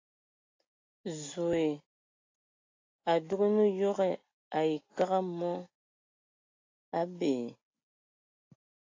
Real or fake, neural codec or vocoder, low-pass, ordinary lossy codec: real; none; 7.2 kHz; AAC, 32 kbps